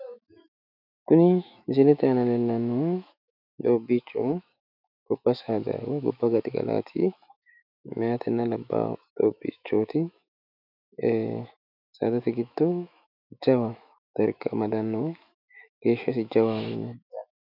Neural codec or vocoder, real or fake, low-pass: autoencoder, 48 kHz, 128 numbers a frame, DAC-VAE, trained on Japanese speech; fake; 5.4 kHz